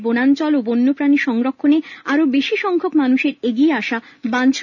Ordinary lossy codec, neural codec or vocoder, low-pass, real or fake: none; none; 7.2 kHz; real